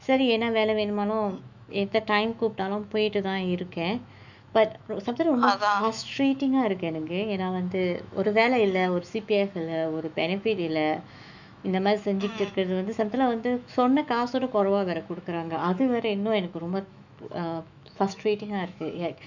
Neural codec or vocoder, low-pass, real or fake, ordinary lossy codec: autoencoder, 48 kHz, 128 numbers a frame, DAC-VAE, trained on Japanese speech; 7.2 kHz; fake; none